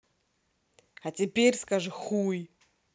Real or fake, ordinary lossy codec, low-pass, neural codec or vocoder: real; none; none; none